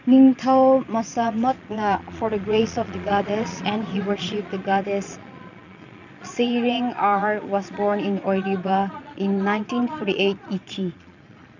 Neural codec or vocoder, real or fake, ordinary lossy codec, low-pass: vocoder, 22.05 kHz, 80 mel bands, Vocos; fake; none; 7.2 kHz